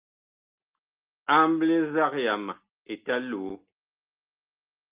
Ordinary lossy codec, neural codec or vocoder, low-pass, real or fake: Opus, 24 kbps; none; 3.6 kHz; real